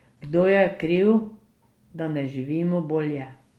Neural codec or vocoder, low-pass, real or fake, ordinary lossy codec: none; 19.8 kHz; real; Opus, 24 kbps